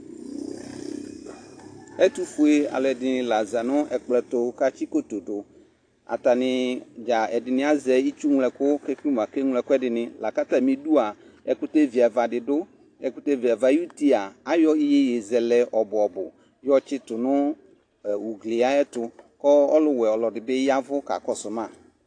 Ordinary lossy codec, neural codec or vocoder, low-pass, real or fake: AAC, 48 kbps; none; 9.9 kHz; real